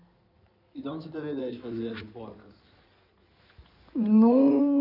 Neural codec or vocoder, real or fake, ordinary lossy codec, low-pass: codec, 16 kHz in and 24 kHz out, 2.2 kbps, FireRedTTS-2 codec; fake; none; 5.4 kHz